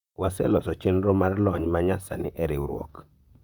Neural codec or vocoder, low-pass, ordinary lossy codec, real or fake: vocoder, 44.1 kHz, 128 mel bands, Pupu-Vocoder; 19.8 kHz; Opus, 64 kbps; fake